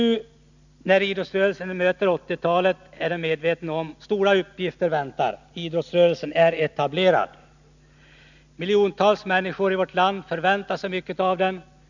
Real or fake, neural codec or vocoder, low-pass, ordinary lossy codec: real; none; 7.2 kHz; none